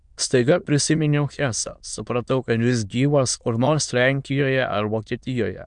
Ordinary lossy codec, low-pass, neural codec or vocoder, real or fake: MP3, 96 kbps; 9.9 kHz; autoencoder, 22.05 kHz, a latent of 192 numbers a frame, VITS, trained on many speakers; fake